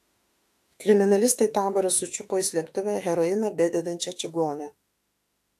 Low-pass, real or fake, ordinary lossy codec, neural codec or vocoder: 14.4 kHz; fake; AAC, 64 kbps; autoencoder, 48 kHz, 32 numbers a frame, DAC-VAE, trained on Japanese speech